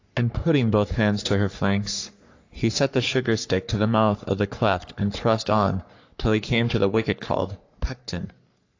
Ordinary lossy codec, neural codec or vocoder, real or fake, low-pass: AAC, 48 kbps; codec, 44.1 kHz, 3.4 kbps, Pupu-Codec; fake; 7.2 kHz